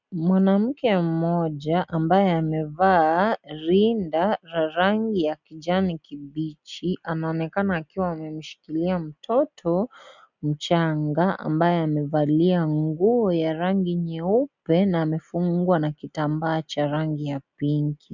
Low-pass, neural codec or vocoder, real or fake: 7.2 kHz; none; real